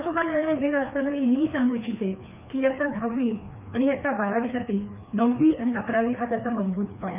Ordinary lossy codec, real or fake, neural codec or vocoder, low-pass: none; fake; codec, 16 kHz, 2 kbps, FreqCodec, larger model; 3.6 kHz